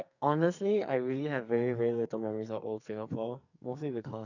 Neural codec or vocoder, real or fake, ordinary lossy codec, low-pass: codec, 44.1 kHz, 2.6 kbps, SNAC; fake; none; 7.2 kHz